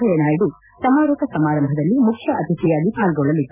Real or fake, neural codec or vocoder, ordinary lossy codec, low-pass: real; none; none; 3.6 kHz